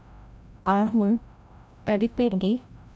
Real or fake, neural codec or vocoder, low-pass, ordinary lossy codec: fake; codec, 16 kHz, 0.5 kbps, FreqCodec, larger model; none; none